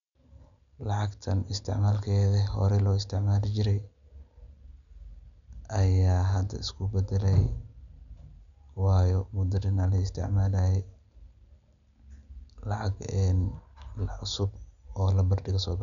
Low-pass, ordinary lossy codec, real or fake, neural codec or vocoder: 7.2 kHz; none; real; none